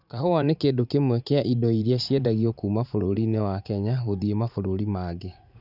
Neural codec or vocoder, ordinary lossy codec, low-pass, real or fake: vocoder, 44.1 kHz, 80 mel bands, Vocos; none; 5.4 kHz; fake